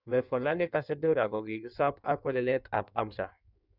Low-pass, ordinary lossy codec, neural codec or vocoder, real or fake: 5.4 kHz; none; codec, 16 kHz in and 24 kHz out, 1.1 kbps, FireRedTTS-2 codec; fake